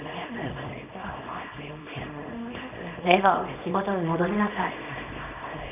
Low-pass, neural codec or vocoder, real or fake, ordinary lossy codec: 3.6 kHz; codec, 24 kHz, 0.9 kbps, WavTokenizer, small release; fake; none